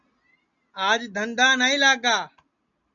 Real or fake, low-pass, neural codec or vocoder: real; 7.2 kHz; none